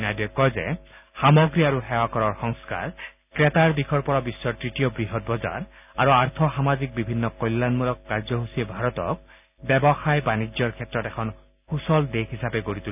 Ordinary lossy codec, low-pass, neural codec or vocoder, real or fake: none; 3.6 kHz; none; real